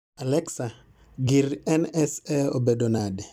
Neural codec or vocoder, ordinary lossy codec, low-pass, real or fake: vocoder, 44.1 kHz, 128 mel bands every 256 samples, BigVGAN v2; none; 19.8 kHz; fake